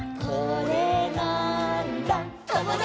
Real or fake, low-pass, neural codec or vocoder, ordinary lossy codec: real; none; none; none